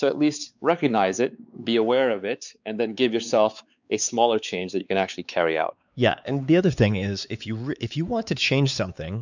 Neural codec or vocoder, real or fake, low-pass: codec, 16 kHz, 4 kbps, X-Codec, WavLM features, trained on Multilingual LibriSpeech; fake; 7.2 kHz